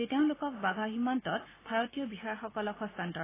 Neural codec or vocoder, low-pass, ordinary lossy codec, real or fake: none; 3.6 kHz; AAC, 16 kbps; real